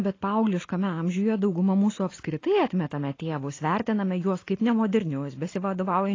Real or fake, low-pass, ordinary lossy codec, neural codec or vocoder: real; 7.2 kHz; AAC, 32 kbps; none